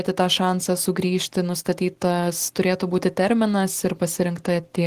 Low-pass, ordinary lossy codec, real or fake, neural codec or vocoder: 14.4 kHz; Opus, 16 kbps; real; none